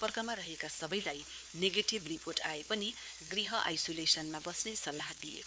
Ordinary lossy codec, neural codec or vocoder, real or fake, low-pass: none; codec, 16 kHz, 4 kbps, X-Codec, WavLM features, trained on Multilingual LibriSpeech; fake; none